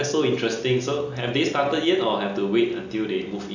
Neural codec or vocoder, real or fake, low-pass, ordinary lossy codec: none; real; 7.2 kHz; none